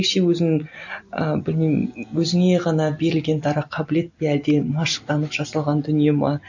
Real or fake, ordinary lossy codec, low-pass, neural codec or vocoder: real; none; 7.2 kHz; none